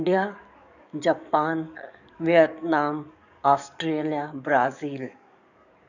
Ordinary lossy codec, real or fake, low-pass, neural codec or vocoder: none; fake; 7.2 kHz; codec, 44.1 kHz, 7.8 kbps, DAC